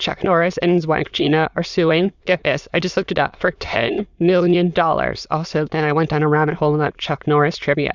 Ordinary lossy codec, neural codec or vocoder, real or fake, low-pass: Opus, 64 kbps; autoencoder, 22.05 kHz, a latent of 192 numbers a frame, VITS, trained on many speakers; fake; 7.2 kHz